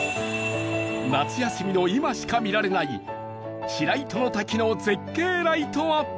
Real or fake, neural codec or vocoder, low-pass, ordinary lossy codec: real; none; none; none